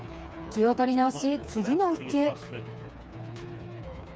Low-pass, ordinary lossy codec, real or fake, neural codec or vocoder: none; none; fake; codec, 16 kHz, 4 kbps, FreqCodec, smaller model